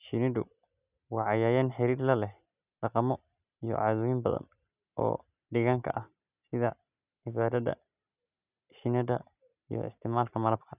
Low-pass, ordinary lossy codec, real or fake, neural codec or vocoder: 3.6 kHz; none; real; none